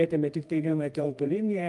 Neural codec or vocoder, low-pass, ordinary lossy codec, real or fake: codec, 24 kHz, 0.9 kbps, WavTokenizer, medium music audio release; 10.8 kHz; Opus, 32 kbps; fake